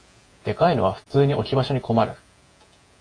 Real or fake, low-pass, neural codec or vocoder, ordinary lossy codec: fake; 9.9 kHz; vocoder, 48 kHz, 128 mel bands, Vocos; AAC, 48 kbps